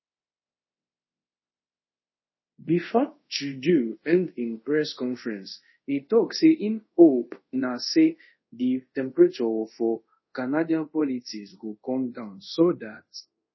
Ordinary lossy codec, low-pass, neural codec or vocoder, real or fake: MP3, 24 kbps; 7.2 kHz; codec, 24 kHz, 0.5 kbps, DualCodec; fake